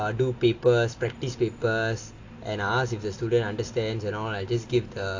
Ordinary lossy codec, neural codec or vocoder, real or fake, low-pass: AAC, 48 kbps; none; real; 7.2 kHz